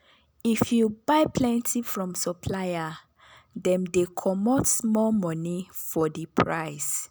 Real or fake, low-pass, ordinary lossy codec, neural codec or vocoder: real; none; none; none